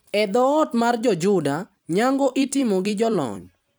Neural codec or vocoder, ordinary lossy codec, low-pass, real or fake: vocoder, 44.1 kHz, 128 mel bands every 256 samples, BigVGAN v2; none; none; fake